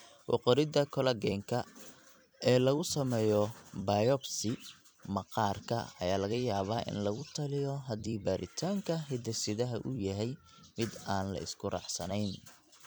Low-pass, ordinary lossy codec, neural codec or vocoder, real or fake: none; none; vocoder, 44.1 kHz, 128 mel bands every 256 samples, BigVGAN v2; fake